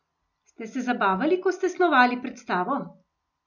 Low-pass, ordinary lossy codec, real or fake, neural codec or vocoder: 7.2 kHz; none; real; none